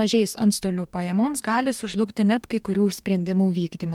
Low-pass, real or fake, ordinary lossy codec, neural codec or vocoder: 19.8 kHz; fake; MP3, 96 kbps; codec, 44.1 kHz, 2.6 kbps, DAC